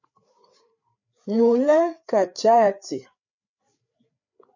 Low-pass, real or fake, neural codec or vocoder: 7.2 kHz; fake; codec, 16 kHz, 4 kbps, FreqCodec, larger model